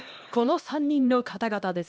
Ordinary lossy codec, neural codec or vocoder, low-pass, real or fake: none; codec, 16 kHz, 1 kbps, X-Codec, HuBERT features, trained on LibriSpeech; none; fake